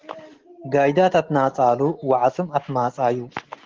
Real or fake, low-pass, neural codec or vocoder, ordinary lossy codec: real; 7.2 kHz; none; Opus, 16 kbps